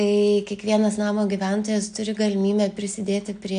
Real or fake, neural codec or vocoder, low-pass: real; none; 9.9 kHz